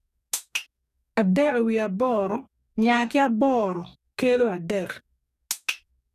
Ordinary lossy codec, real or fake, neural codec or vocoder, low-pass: none; fake; codec, 44.1 kHz, 2.6 kbps, DAC; 14.4 kHz